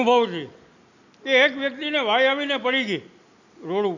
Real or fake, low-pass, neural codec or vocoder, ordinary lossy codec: real; 7.2 kHz; none; none